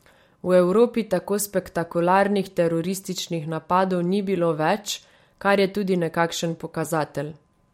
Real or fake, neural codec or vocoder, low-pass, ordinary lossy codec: fake; vocoder, 44.1 kHz, 128 mel bands every 256 samples, BigVGAN v2; 19.8 kHz; MP3, 64 kbps